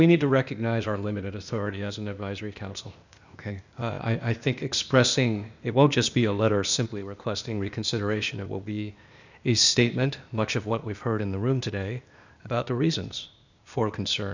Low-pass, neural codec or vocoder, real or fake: 7.2 kHz; codec, 16 kHz, 0.8 kbps, ZipCodec; fake